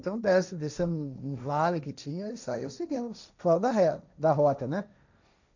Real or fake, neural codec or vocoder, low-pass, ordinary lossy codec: fake; codec, 16 kHz, 1.1 kbps, Voila-Tokenizer; 7.2 kHz; none